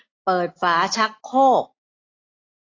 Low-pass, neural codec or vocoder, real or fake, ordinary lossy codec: 7.2 kHz; none; real; AAC, 32 kbps